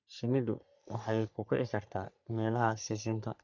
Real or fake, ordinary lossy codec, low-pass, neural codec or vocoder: fake; none; 7.2 kHz; codec, 44.1 kHz, 3.4 kbps, Pupu-Codec